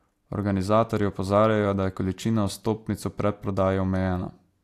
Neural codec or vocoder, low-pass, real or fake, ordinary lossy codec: none; 14.4 kHz; real; AAC, 64 kbps